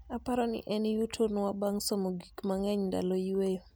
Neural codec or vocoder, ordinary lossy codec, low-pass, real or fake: none; none; none; real